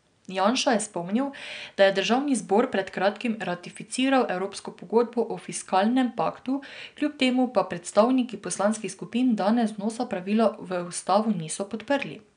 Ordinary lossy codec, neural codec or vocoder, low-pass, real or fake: none; none; 9.9 kHz; real